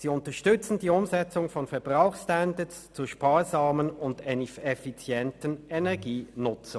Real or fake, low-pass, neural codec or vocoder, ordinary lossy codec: real; none; none; none